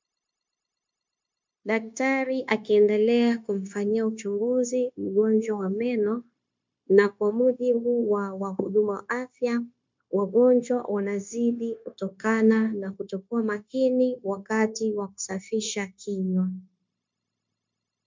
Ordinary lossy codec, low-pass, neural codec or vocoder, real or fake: MP3, 64 kbps; 7.2 kHz; codec, 16 kHz, 0.9 kbps, LongCat-Audio-Codec; fake